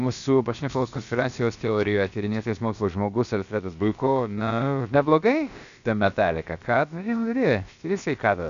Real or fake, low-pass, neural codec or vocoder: fake; 7.2 kHz; codec, 16 kHz, about 1 kbps, DyCAST, with the encoder's durations